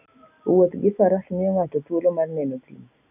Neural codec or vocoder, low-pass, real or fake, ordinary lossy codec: none; 3.6 kHz; real; none